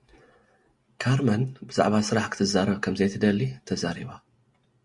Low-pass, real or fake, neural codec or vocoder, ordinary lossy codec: 10.8 kHz; real; none; Opus, 64 kbps